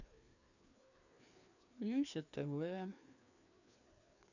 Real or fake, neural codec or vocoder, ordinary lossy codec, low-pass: fake; codec, 16 kHz, 2 kbps, FreqCodec, larger model; none; 7.2 kHz